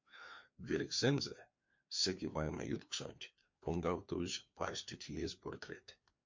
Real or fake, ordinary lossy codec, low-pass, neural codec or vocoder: fake; MP3, 48 kbps; 7.2 kHz; codec, 16 kHz, 2 kbps, FreqCodec, larger model